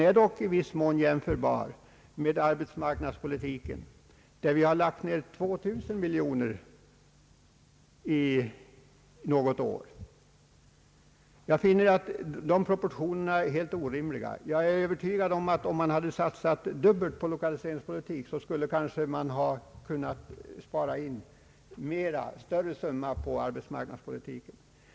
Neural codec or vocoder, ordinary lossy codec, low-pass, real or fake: none; none; none; real